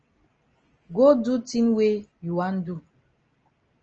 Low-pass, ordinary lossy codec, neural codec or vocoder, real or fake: 7.2 kHz; Opus, 24 kbps; none; real